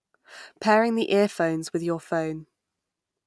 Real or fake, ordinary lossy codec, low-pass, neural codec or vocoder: real; none; none; none